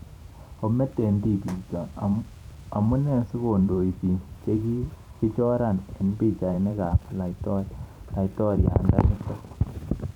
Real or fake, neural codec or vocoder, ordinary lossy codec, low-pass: fake; vocoder, 44.1 kHz, 128 mel bands every 512 samples, BigVGAN v2; none; 19.8 kHz